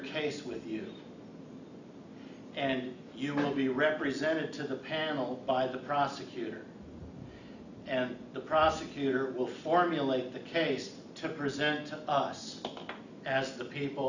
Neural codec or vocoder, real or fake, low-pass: none; real; 7.2 kHz